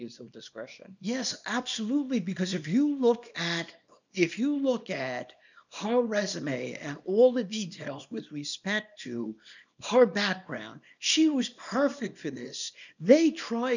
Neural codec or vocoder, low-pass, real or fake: codec, 24 kHz, 0.9 kbps, WavTokenizer, small release; 7.2 kHz; fake